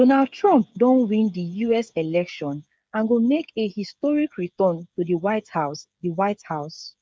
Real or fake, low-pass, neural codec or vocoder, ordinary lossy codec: fake; none; codec, 16 kHz, 8 kbps, FreqCodec, smaller model; none